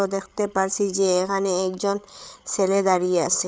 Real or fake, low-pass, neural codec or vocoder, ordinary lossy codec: fake; none; codec, 16 kHz, 8 kbps, FreqCodec, larger model; none